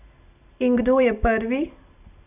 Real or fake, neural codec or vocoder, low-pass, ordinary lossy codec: real; none; 3.6 kHz; none